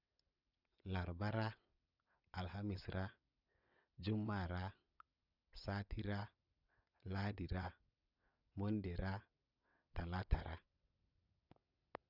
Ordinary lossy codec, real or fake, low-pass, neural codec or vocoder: none; fake; 5.4 kHz; vocoder, 22.05 kHz, 80 mel bands, WaveNeXt